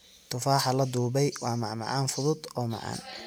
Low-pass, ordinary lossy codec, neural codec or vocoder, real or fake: none; none; none; real